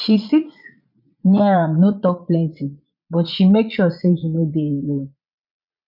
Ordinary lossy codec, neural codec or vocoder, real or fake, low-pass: none; vocoder, 22.05 kHz, 80 mel bands, Vocos; fake; 5.4 kHz